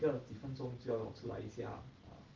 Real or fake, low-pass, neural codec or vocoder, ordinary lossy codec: real; 7.2 kHz; none; Opus, 16 kbps